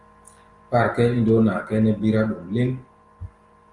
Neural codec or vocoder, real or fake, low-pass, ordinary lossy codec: none; real; 10.8 kHz; Opus, 24 kbps